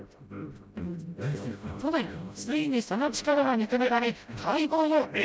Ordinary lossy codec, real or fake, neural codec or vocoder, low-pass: none; fake; codec, 16 kHz, 0.5 kbps, FreqCodec, smaller model; none